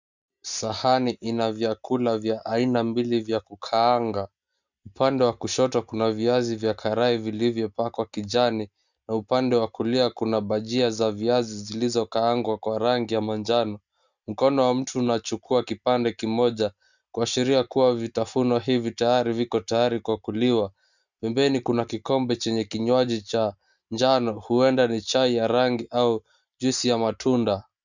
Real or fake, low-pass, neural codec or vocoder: real; 7.2 kHz; none